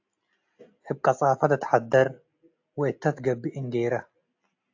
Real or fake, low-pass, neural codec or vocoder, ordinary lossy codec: real; 7.2 kHz; none; AAC, 48 kbps